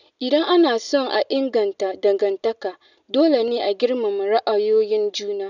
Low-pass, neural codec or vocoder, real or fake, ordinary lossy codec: 7.2 kHz; none; real; none